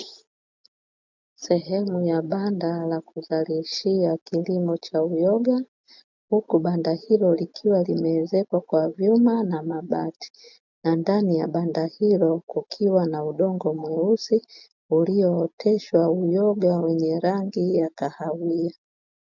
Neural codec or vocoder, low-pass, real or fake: none; 7.2 kHz; real